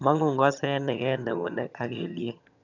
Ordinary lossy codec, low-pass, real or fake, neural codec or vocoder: none; 7.2 kHz; fake; vocoder, 22.05 kHz, 80 mel bands, HiFi-GAN